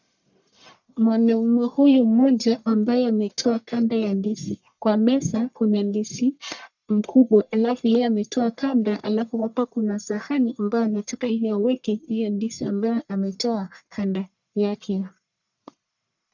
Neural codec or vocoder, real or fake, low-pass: codec, 44.1 kHz, 1.7 kbps, Pupu-Codec; fake; 7.2 kHz